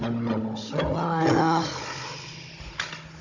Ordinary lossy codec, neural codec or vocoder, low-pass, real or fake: none; codec, 16 kHz, 16 kbps, FunCodec, trained on Chinese and English, 50 frames a second; 7.2 kHz; fake